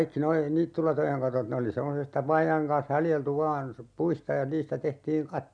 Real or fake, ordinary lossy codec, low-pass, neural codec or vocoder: real; none; 9.9 kHz; none